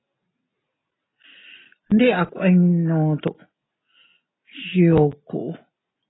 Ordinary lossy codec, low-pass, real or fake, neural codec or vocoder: AAC, 16 kbps; 7.2 kHz; real; none